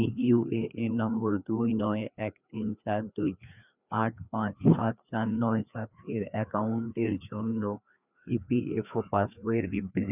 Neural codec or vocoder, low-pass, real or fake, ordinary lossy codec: codec, 16 kHz, 2 kbps, FreqCodec, larger model; 3.6 kHz; fake; none